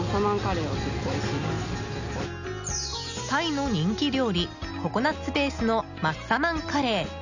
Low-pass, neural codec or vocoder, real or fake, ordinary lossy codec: 7.2 kHz; none; real; none